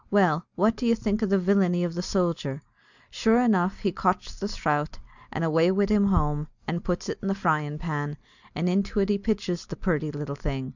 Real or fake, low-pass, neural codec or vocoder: real; 7.2 kHz; none